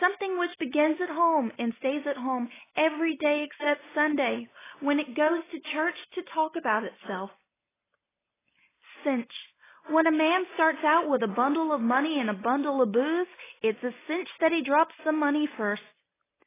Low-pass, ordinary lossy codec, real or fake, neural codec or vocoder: 3.6 kHz; AAC, 16 kbps; real; none